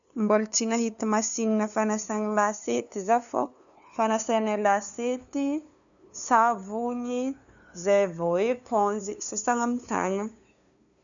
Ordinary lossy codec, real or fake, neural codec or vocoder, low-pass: none; fake; codec, 16 kHz, 2 kbps, FunCodec, trained on LibriTTS, 25 frames a second; 7.2 kHz